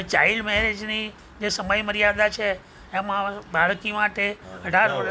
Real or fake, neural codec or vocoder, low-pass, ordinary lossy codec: real; none; none; none